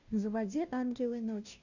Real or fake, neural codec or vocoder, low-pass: fake; codec, 16 kHz, 0.5 kbps, FunCodec, trained on Chinese and English, 25 frames a second; 7.2 kHz